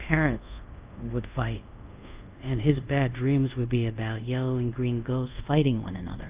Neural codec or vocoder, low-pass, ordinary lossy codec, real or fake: codec, 24 kHz, 0.5 kbps, DualCodec; 3.6 kHz; Opus, 32 kbps; fake